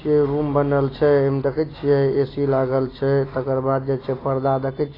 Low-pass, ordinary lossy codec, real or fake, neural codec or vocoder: 5.4 kHz; AAC, 24 kbps; real; none